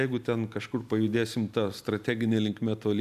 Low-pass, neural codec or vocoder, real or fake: 14.4 kHz; none; real